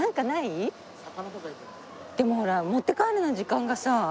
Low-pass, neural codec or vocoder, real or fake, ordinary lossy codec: none; none; real; none